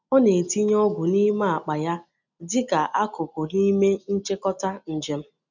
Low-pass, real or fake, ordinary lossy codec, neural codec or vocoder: 7.2 kHz; real; none; none